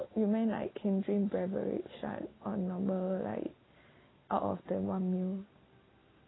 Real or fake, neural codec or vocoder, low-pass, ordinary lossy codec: real; none; 7.2 kHz; AAC, 16 kbps